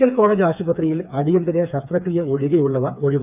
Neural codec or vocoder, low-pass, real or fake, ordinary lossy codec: codec, 16 kHz, 4 kbps, FreqCodec, smaller model; 3.6 kHz; fake; none